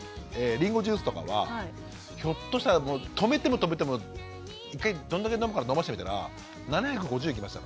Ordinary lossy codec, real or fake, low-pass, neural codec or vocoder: none; real; none; none